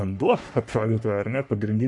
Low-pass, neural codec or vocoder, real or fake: 10.8 kHz; codec, 44.1 kHz, 3.4 kbps, Pupu-Codec; fake